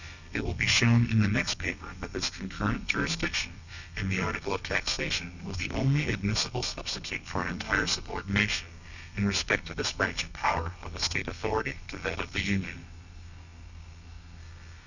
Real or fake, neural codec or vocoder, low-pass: fake; codec, 32 kHz, 1.9 kbps, SNAC; 7.2 kHz